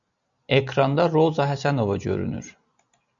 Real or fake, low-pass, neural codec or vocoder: real; 7.2 kHz; none